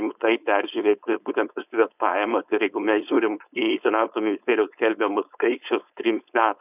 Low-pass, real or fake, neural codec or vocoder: 3.6 kHz; fake; codec, 16 kHz, 4.8 kbps, FACodec